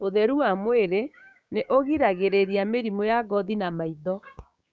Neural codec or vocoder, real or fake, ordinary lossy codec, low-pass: codec, 16 kHz, 6 kbps, DAC; fake; none; none